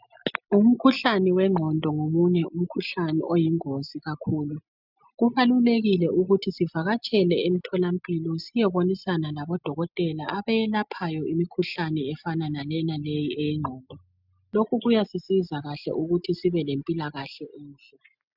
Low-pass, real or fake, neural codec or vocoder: 5.4 kHz; real; none